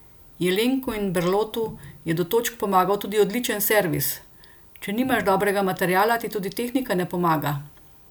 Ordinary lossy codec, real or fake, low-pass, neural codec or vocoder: none; real; none; none